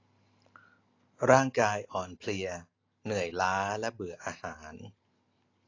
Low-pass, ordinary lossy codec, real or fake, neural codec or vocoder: 7.2 kHz; AAC, 32 kbps; real; none